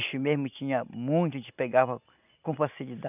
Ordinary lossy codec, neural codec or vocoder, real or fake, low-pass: none; none; real; 3.6 kHz